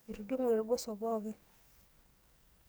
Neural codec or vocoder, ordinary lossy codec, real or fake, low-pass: codec, 44.1 kHz, 2.6 kbps, SNAC; none; fake; none